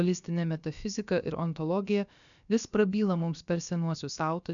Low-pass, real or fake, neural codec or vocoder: 7.2 kHz; fake; codec, 16 kHz, about 1 kbps, DyCAST, with the encoder's durations